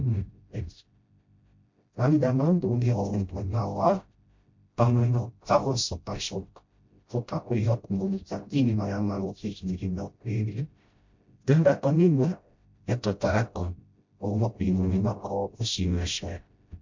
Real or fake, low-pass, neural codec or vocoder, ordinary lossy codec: fake; 7.2 kHz; codec, 16 kHz, 0.5 kbps, FreqCodec, smaller model; MP3, 48 kbps